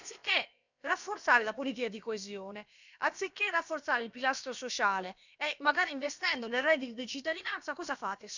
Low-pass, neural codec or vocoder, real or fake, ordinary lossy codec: 7.2 kHz; codec, 16 kHz, about 1 kbps, DyCAST, with the encoder's durations; fake; none